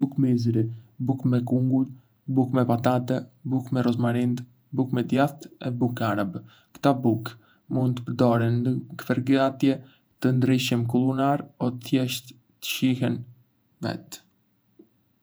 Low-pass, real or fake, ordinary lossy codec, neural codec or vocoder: none; fake; none; vocoder, 48 kHz, 128 mel bands, Vocos